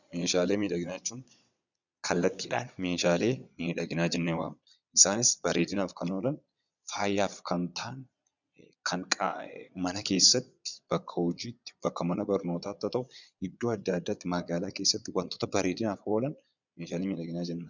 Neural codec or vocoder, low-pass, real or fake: vocoder, 22.05 kHz, 80 mel bands, Vocos; 7.2 kHz; fake